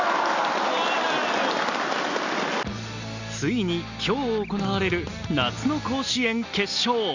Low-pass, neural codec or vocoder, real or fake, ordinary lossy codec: 7.2 kHz; none; real; Opus, 64 kbps